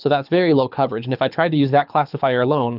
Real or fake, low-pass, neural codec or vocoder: fake; 5.4 kHz; codec, 24 kHz, 6 kbps, HILCodec